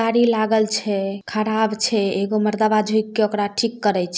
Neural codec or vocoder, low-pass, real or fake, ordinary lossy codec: none; none; real; none